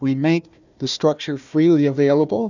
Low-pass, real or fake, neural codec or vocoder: 7.2 kHz; fake; codec, 16 kHz, 1 kbps, FunCodec, trained on Chinese and English, 50 frames a second